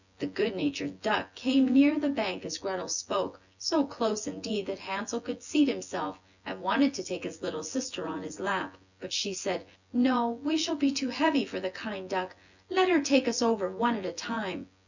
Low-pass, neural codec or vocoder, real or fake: 7.2 kHz; vocoder, 24 kHz, 100 mel bands, Vocos; fake